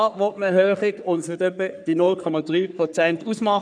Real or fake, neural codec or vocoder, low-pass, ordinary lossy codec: fake; codec, 44.1 kHz, 3.4 kbps, Pupu-Codec; 9.9 kHz; none